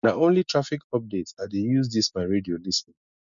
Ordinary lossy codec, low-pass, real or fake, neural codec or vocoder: none; 7.2 kHz; real; none